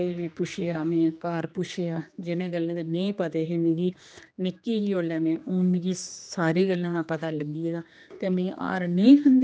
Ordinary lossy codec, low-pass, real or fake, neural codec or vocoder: none; none; fake; codec, 16 kHz, 2 kbps, X-Codec, HuBERT features, trained on general audio